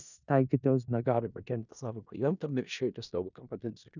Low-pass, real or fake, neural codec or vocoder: 7.2 kHz; fake; codec, 16 kHz in and 24 kHz out, 0.4 kbps, LongCat-Audio-Codec, four codebook decoder